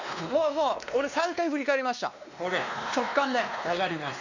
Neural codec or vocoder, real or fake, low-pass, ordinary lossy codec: codec, 16 kHz, 2 kbps, X-Codec, WavLM features, trained on Multilingual LibriSpeech; fake; 7.2 kHz; none